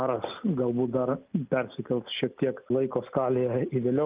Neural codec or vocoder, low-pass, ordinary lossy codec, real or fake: none; 3.6 kHz; Opus, 32 kbps; real